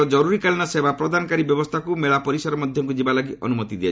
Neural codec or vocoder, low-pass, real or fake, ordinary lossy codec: none; none; real; none